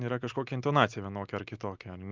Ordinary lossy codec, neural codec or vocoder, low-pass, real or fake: Opus, 32 kbps; none; 7.2 kHz; real